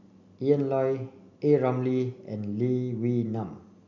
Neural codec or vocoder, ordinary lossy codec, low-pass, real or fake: none; none; 7.2 kHz; real